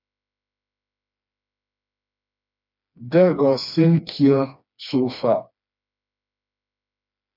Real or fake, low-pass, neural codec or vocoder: fake; 5.4 kHz; codec, 16 kHz, 2 kbps, FreqCodec, smaller model